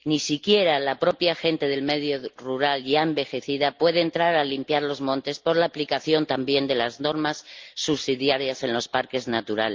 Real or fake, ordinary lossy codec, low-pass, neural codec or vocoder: real; Opus, 32 kbps; 7.2 kHz; none